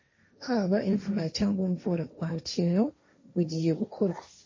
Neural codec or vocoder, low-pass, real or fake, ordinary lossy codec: codec, 16 kHz, 1.1 kbps, Voila-Tokenizer; 7.2 kHz; fake; MP3, 32 kbps